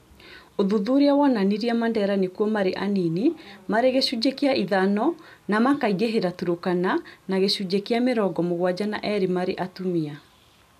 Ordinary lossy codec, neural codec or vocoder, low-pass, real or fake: none; none; 14.4 kHz; real